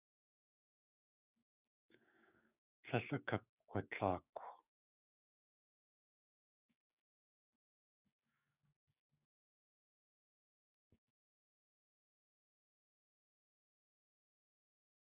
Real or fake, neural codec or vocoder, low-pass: fake; codec, 16 kHz, 6 kbps, DAC; 3.6 kHz